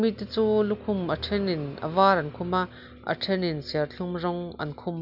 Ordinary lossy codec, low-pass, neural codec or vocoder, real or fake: none; 5.4 kHz; none; real